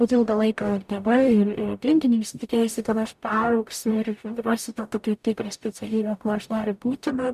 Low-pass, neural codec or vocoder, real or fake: 14.4 kHz; codec, 44.1 kHz, 0.9 kbps, DAC; fake